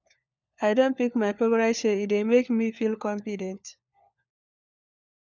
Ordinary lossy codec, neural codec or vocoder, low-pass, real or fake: none; codec, 16 kHz, 4 kbps, FunCodec, trained on LibriTTS, 50 frames a second; 7.2 kHz; fake